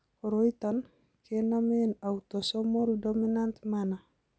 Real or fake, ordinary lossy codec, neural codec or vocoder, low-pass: real; none; none; none